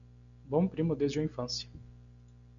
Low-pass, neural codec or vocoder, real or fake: 7.2 kHz; none; real